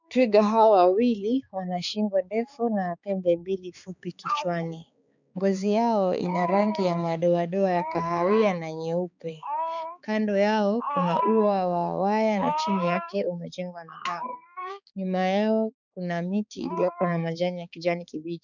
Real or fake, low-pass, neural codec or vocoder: fake; 7.2 kHz; codec, 16 kHz, 4 kbps, X-Codec, HuBERT features, trained on balanced general audio